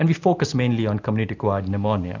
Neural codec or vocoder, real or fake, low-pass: none; real; 7.2 kHz